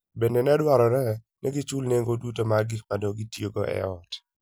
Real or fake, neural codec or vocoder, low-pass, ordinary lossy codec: real; none; none; none